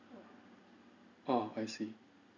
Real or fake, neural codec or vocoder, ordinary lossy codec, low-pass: real; none; none; 7.2 kHz